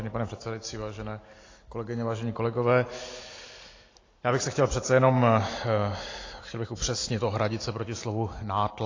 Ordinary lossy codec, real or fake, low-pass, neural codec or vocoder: AAC, 32 kbps; real; 7.2 kHz; none